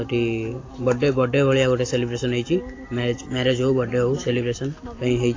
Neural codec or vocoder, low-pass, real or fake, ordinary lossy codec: none; 7.2 kHz; real; AAC, 32 kbps